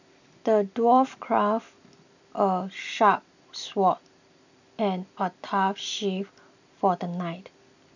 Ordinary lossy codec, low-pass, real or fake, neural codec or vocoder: none; 7.2 kHz; real; none